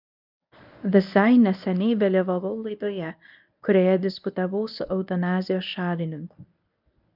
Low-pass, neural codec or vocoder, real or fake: 5.4 kHz; codec, 24 kHz, 0.9 kbps, WavTokenizer, medium speech release version 1; fake